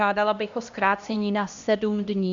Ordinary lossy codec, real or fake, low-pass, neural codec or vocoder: Opus, 64 kbps; fake; 7.2 kHz; codec, 16 kHz, 1 kbps, X-Codec, HuBERT features, trained on LibriSpeech